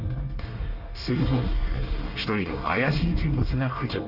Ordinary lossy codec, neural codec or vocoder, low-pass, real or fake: Opus, 32 kbps; codec, 24 kHz, 1 kbps, SNAC; 5.4 kHz; fake